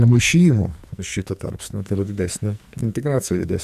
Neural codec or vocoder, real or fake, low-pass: codec, 44.1 kHz, 2.6 kbps, SNAC; fake; 14.4 kHz